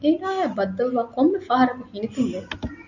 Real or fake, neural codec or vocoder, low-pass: real; none; 7.2 kHz